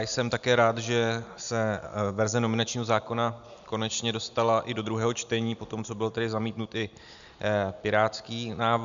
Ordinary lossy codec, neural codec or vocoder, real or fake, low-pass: MP3, 96 kbps; none; real; 7.2 kHz